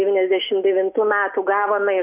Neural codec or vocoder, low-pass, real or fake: none; 3.6 kHz; real